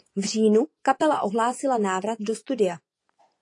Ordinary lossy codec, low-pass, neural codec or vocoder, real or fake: AAC, 48 kbps; 10.8 kHz; vocoder, 24 kHz, 100 mel bands, Vocos; fake